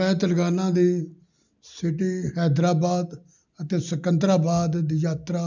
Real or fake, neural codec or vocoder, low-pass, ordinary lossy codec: real; none; 7.2 kHz; none